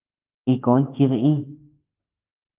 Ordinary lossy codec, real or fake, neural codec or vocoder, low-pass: Opus, 24 kbps; fake; autoencoder, 48 kHz, 32 numbers a frame, DAC-VAE, trained on Japanese speech; 3.6 kHz